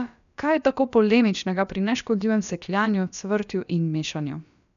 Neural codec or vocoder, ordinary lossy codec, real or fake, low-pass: codec, 16 kHz, about 1 kbps, DyCAST, with the encoder's durations; none; fake; 7.2 kHz